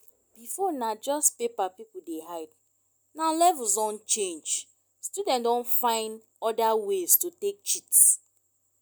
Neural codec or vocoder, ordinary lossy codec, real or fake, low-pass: none; none; real; none